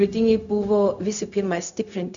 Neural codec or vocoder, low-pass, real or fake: codec, 16 kHz, 0.4 kbps, LongCat-Audio-Codec; 7.2 kHz; fake